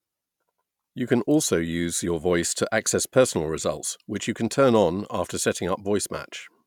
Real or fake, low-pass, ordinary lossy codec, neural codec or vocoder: real; 19.8 kHz; none; none